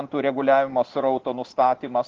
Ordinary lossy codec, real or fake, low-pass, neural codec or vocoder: Opus, 16 kbps; real; 7.2 kHz; none